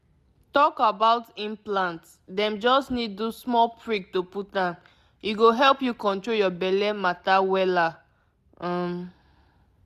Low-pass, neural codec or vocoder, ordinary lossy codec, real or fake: 14.4 kHz; none; none; real